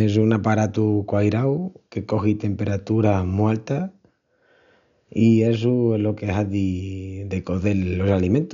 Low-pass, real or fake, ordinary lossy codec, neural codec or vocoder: 7.2 kHz; real; none; none